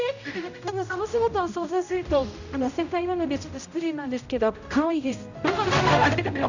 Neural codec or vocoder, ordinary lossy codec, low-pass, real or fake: codec, 16 kHz, 0.5 kbps, X-Codec, HuBERT features, trained on general audio; none; 7.2 kHz; fake